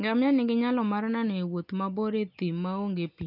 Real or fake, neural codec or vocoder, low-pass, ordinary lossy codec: real; none; 5.4 kHz; none